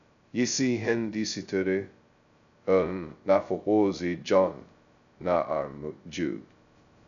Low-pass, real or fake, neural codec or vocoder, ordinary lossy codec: 7.2 kHz; fake; codec, 16 kHz, 0.2 kbps, FocalCodec; none